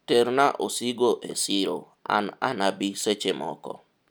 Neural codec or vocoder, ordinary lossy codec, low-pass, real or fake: none; none; none; real